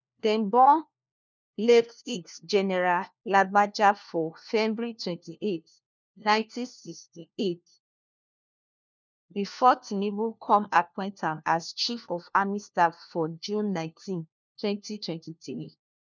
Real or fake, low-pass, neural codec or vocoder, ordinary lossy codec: fake; 7.2 kHz; codec, 16 kHz, 1 kbps, FunCodec, trained on LibriTTS, 50 frames a second; none